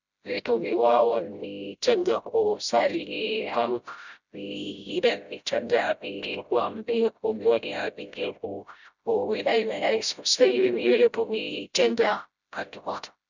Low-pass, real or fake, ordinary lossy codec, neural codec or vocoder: 7.2 kHz; fake; none; codec, 16 kHz, 0.5 kbps, FreqCodec, smaller model